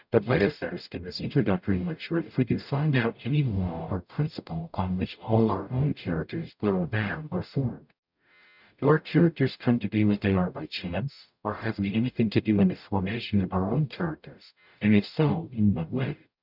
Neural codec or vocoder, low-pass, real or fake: codec, 44.1 kHz, 0.9 kbps, DAC; 5.4 kHz; fake